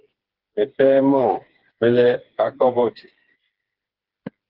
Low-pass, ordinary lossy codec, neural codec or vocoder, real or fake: 5.4 kHz; Opus, 16 kbps; codec, 16 kHz, 4 kbps, FreqCodec, smaller model; fake